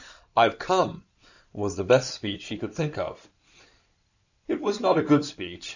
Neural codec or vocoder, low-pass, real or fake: codec, 16 kHz in and 24 kHz out, 2.2 kbps, FireRedTTS-2 codec; 7.2 kHz; fake